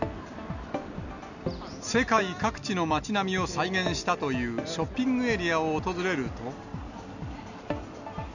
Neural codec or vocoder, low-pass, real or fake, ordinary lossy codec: none; 7.2 kHz; real; none